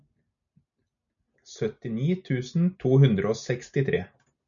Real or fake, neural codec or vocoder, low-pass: real; none; 7.2 kHz